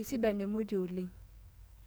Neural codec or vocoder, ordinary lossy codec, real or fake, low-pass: codec, 44.1 kHz, 2.6 kbps, SNAC; none; fake; none